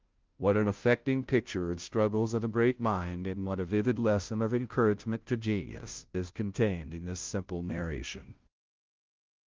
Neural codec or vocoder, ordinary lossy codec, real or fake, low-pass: codec, 16 kHz, 0.5 kbps, FunCodec, trained on Chinese and English, 25 frames a second; Opus, 32 kbps; fake; 7.2 kHz